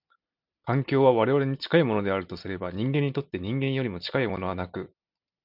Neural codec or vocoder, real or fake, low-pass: none; real; 5.4 kHz